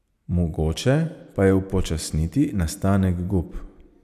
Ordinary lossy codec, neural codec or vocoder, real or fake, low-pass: none; none; real; 14.4 kHz